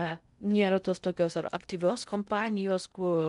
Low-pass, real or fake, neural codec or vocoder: 10.8 kHz; fake; codec, 16 kHz in and 24 kHz out, 0.6 kbps, FocalCodec, streaming, 2048 codes